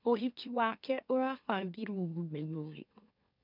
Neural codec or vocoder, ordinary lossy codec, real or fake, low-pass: autoencoder, 44.1 kHz, a latent of 192 numbers a frame, MeloTTS; none; fake; 5.4 kHz